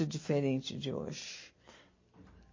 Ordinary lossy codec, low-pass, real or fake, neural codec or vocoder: MP3, 32 kbps; 7.2 kHz; real; none